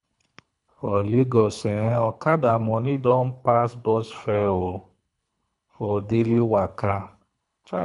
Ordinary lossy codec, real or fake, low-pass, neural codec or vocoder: none; fake; 10.8 kHz; codec, 24 kHz, 3 kbps, HILCodec